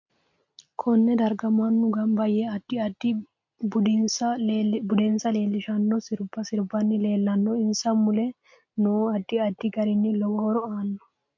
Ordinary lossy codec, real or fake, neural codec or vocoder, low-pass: MP3, 48 kbps; real; none; 7.2 kHz